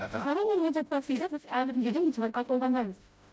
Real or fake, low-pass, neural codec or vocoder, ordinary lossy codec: fake; none; codec, 16 kHz, 0.5 kbps, FreqCodec, smaller model; none